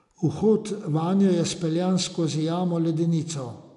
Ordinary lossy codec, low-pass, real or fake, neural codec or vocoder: none; 10.8 kHz; real; none